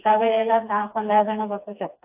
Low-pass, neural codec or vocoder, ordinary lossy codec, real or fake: 3.6 kHz; codec, 16 kHz, 2 kbps, FreqCodec, smaller model; AAC, 32 kbps; fake